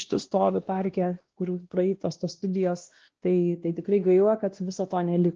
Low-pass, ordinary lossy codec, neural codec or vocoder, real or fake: 7.2 kHz; Opus, 16 kbps; codec, 16 kHz, 1 kbps, X-Codec, WavLM features, trained on Multilingual LibriSpeech; fake